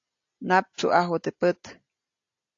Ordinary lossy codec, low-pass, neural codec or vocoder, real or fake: AAC, 48 kbps; 7.2 kHz; none; real